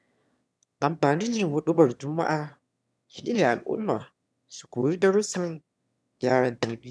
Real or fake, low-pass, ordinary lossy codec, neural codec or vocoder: fake; none; none; autoencoder, 22.05 kHz, a latent of 192 numbers a frame, VITS, trained on one speaker